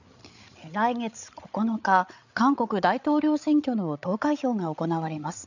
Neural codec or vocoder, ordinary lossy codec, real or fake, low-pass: codec, 16 kHz, 8 kbps, FreqCodec, larger model; none; fake; 7.2 kHz